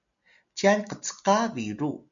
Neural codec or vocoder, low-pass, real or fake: none; 7.2 kHz; real